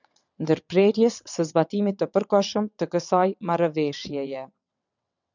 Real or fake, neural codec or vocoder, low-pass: fake; vocoder, 22.05 kHz, 80 mel bands, WaveNeXt; 7.2 kHz